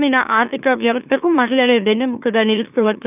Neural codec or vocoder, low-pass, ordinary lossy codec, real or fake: autoencoder, 44.1 kHz, a latent of 192 numbers a frame, MeloTTS; 3.6 kHz; none; fake